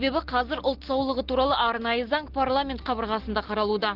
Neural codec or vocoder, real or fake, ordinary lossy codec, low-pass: none; real; Opus, 16 kbps; 5.4 kHz